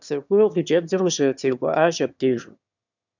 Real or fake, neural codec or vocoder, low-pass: fake; autoencoder, 22.05 kHz, a latent of 192 numbers a frame, VITS, trained on one speaker; 7.2 kHz